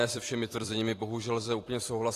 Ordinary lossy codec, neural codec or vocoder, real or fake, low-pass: AAC, 48 kbps; vocoder, 44.1 kHz, 128 mel bands every 256 samples, BigVGAN v2; fake; 14.4 kHz